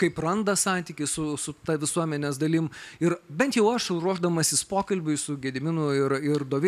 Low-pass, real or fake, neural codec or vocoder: 14.4 kHz; real; none